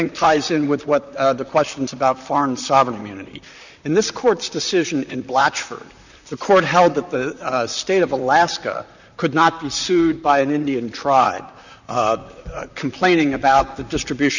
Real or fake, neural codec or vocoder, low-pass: fake; vocoder, 44.1 kHz, 128 mel bands, Pupu-Vocoder; 7.2 kHz